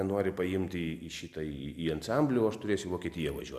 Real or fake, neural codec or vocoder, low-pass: real; none; 14.4 kHz